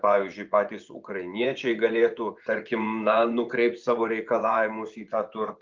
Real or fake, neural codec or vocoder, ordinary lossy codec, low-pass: fake; vocoder, 24 kHz, 100 mel bands, Vocos; Opus, 24 kbps; 7.2 kHz